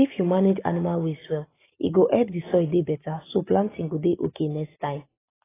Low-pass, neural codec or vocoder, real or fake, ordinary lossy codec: 3.6 kHz; none; real; AAC, 16 kbps